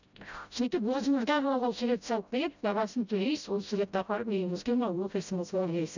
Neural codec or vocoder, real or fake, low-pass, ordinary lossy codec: codec, 16 kHz, 0.5 kbps, FreqCodec, smaller model; fake; 7.2 kHz; none